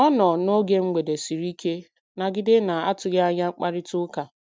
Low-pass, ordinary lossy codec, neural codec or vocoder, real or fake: none; none; none; real